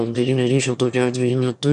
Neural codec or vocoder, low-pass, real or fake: autoencoder, 22.05 kHz, a latent of 192 numbers a frame, VITS, trained on one speaker; 9.9 kHz; fake